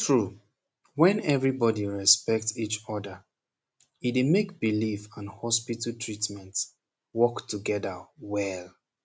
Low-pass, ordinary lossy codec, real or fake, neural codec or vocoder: none; none; real; none